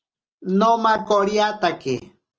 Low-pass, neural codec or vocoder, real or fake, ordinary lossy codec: 7.2 kHz; none; real; Opus, 24 kbps